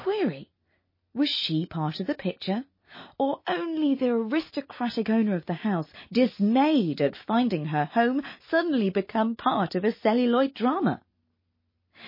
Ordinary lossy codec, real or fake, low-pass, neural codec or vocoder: MP3, 24 kbps; real; 5.4 kHz; none